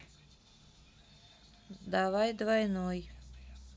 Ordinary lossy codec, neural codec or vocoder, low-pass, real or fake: none; none; none; real